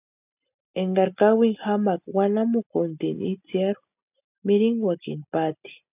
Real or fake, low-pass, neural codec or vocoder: real; 3.6 kHz; none